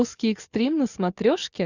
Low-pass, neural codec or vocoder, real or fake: 7.2 kHz; none; real